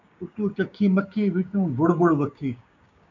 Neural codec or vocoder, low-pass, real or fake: codec, 16 kHz, 6 kbps, DAC; 7.2 kHz; fake